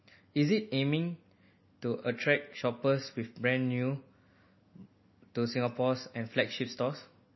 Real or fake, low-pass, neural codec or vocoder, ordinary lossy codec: real; 7.2 kHz; none; MP3, 24 kbps